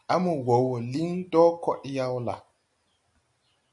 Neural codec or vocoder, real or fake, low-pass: none; real; 10.8 kHz